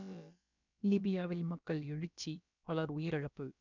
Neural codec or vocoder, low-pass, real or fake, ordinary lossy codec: codec, 16 kHz, about 1 kbps, DyCAST, with the encoder's durations; 7.2 kHz; fake; none